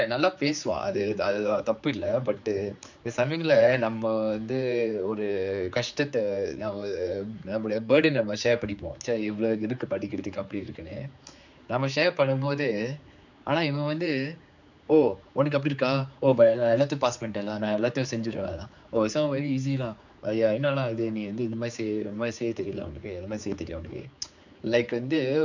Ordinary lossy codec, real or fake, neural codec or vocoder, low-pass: none; fake; codec, 16 kHz, 4 kbps, X-Codec, HuBERT features, trained on general audio; 7.2 kHz